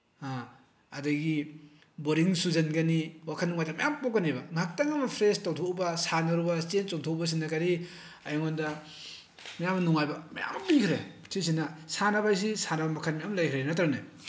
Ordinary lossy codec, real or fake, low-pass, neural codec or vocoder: none; real; none; none